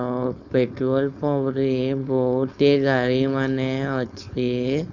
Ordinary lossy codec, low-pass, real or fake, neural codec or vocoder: none; 7.2 kHz; fake; codec, 16 kHz, 4.8 kbps, FACodec